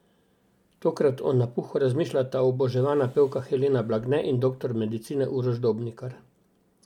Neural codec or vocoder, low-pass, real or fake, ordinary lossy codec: none; 19.8 kHz; real; MP3, 96 kbps